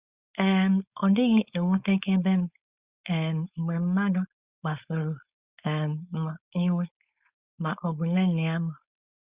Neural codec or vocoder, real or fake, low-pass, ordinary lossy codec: codec, 16 kHz, 4.8 kbps, FACodec; fake; 3.6 kHz; Opus, 64 kbps